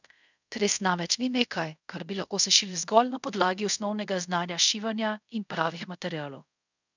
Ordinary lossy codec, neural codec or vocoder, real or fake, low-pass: none; codec, 24 kHz, 0.5 kbps, DualCodec; fake; 7.2 kHz